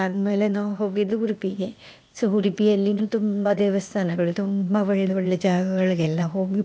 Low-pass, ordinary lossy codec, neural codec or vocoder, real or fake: none; none; codec, 16 kHz, 0.8 kbps, ZipCodec; fake